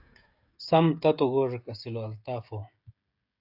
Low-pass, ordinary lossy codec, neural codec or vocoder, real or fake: 5.4 kHz; AAC, 48 kbps; codec, 16 kHz, 16 kbps, FreqCodec, smaller model; fake